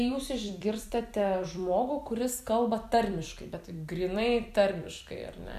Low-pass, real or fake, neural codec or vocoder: 14.4 kHz; fake; vocoder, 44.1 kHz, 128 mel bands every 256 samples, BigVGAN v2